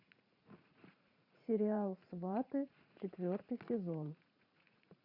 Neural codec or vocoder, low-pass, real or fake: vocoder, 44.1 kHz, 80 mel bands, Vocos; 5.4 kHz; fake